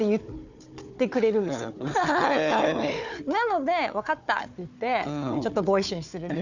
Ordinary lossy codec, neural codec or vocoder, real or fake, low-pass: none; codec, 16 kHz, 4 kbps, FunCodec, trained on Chinese and English, 50 frames a second; fake; 7.2 kHz